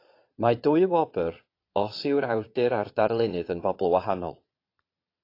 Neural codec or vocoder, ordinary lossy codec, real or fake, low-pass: vocoder, 22.05 kHz, 80 mel bands, Vocos; AAC, 32 kbps; fake; 5.4 kHz